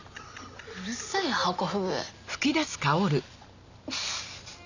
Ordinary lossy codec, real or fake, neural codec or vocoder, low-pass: none; real; none; 7.2 kHz